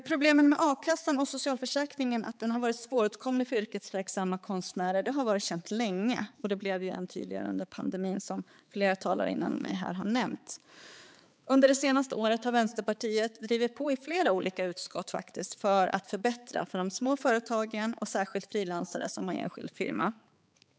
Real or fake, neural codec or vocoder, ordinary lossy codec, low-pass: fake; codec, 16 kHz, 4 kbps, X-Codec, HuBERT features, trained on balanced general audio; none; none